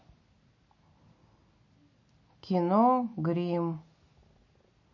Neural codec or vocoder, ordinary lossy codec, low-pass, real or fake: none; MP3, 32 kbps; 7.2 kHz; real